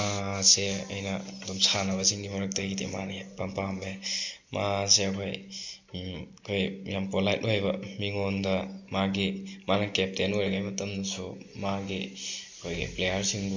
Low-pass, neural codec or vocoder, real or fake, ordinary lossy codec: 7.2 kHz; none; real; MP3, 64 kbps